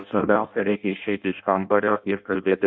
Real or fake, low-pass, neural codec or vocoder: fake; 7.2 kHz; codec, 16 kHz in and 24 kHz out, 0.6 kbps, FireRedTTS-2 codec